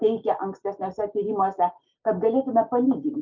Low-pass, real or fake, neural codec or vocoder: 7.2 kHz; real; none